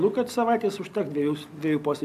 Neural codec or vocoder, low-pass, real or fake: none; 14.4 kHz; real